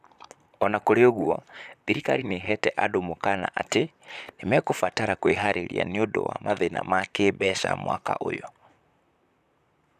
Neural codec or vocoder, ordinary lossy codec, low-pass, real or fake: vocoder, 44.1 kHz, 128 mel bands, Pupu-Vocoder; none; 14.4 kHz; fake